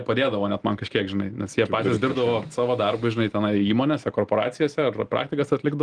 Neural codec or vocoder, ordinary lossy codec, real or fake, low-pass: none; Opus, 24 kbps; real; 9.9 kHz